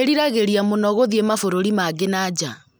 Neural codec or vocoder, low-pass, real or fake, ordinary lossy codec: none; none; real; none